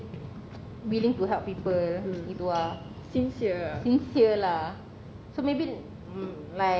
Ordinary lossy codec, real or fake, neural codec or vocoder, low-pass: none; real; none; none